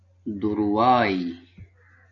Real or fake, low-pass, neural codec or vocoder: real; 7.2 kHz; none